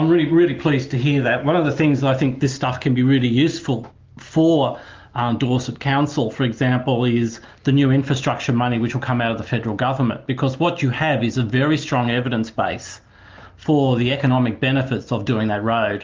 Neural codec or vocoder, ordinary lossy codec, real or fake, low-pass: none; Opus, 32 kbps; real; 7.2 kHz